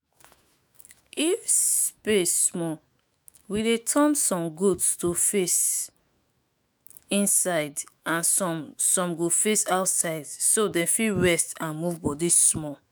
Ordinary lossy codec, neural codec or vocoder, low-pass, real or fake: none; autoencoder, 48 kHz, 128 numbers a frame, DAC-VAE, trained on Japanese speech; none; fake